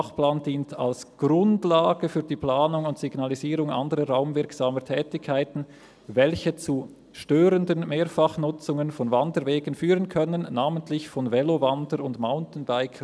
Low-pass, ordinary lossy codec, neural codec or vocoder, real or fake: none; none; none; real